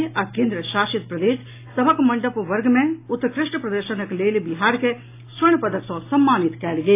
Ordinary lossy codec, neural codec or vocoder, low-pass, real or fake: AAC, 24 kbps; none; 3.6 kHz; real